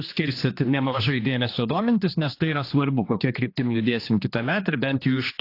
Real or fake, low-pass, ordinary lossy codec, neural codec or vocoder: fake; 5.4 kHz; AAC, 32 kbps; codec, 16 kHz, 2 kbps, X-Codec, HuBERT features, trained on general audio